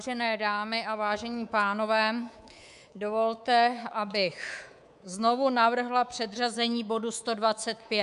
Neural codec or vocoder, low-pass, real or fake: codec, 24 kHz, 3.1 kbps, DualCodec; 10.8 kHz; fake